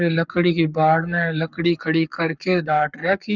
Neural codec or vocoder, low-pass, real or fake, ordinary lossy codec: codec, 44.1 kHz, 7.8 kbps, Pupu-Codec; 7.2 kHz; fake; none